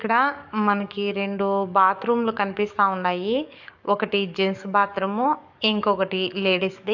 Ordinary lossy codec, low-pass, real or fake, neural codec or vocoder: none; 7.2 kHz; fake; codec, 44.1 kHz, 7.8 kbps, DAC